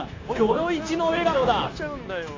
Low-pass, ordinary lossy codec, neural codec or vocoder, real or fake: 7.2 kHz; AAC, 32 kbps; codec, 16 kHz, 0.9 kbps, LongCat-Audio-Codec; fake